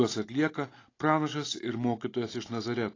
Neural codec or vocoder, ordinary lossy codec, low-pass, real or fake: none; AAC, 32 kbps; 7.2 kHz; real